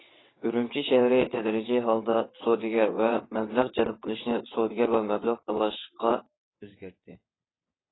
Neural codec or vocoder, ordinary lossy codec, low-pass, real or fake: vocoder, 22.05 kHz, 80 mel bands, WaveNeXt; AAC, 16 kbps; 7.2 kHz; fake